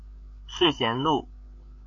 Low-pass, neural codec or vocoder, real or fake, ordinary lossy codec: 7.2 kHz; codec, 16 kHz, 8 kbps, FreqCodec, larger model; fake; MP3, 48 kbps